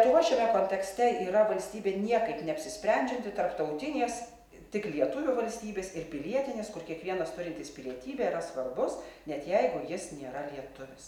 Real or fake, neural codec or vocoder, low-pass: real; none; 19.8 kHz